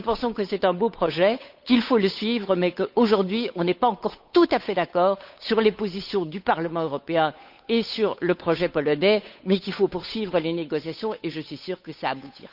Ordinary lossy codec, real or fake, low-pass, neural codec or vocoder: none; fake; 5.4 kHz; codec, 16 kHz, 8 kbps, FunCodec, trained on Chinese and English, 25 frames a second